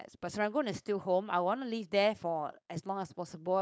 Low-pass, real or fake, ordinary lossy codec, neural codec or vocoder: none; fake; none; codec, 16 kHz, 4.8 kbps, FACodec